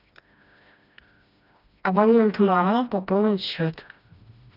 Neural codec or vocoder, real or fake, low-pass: codec, 16 kHz, 1 kbps, FreqCodec, smaller model; fake; 5.4 kHz